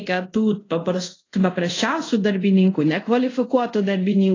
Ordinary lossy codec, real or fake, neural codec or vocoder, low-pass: AAC, 32 kbps; fake; codec, 24 kHz, 0.5 kbps, DualCodec; 7.2 kHz